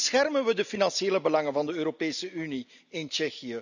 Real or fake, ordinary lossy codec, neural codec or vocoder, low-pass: real; none; none; 7.2 kHz